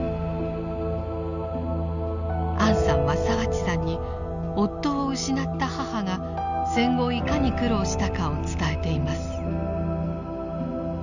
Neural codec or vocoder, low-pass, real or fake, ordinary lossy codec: none; 7.2 kHz; real; none